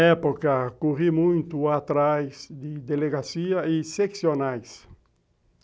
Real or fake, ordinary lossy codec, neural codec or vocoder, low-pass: real; none; none; none